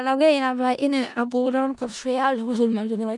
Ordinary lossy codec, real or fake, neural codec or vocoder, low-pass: none; fake; codec, 16 kHz in and 24 kHz out, 0.4 kbps, LongCat-Audio-Codec, four codebook decoder; 10.8 kHz